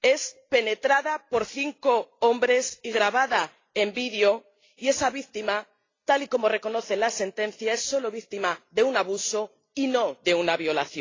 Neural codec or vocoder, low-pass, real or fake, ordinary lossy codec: none; 7.2 kHz; real; AAC, 32 kbps